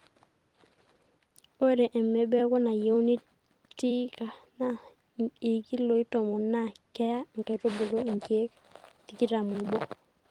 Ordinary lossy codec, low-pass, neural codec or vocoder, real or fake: Opus, 24 kbps; 19.8 kHz; vocoder, 44.1 kHz, 128 mel bands every 256 samples, BigVGAN v2; fake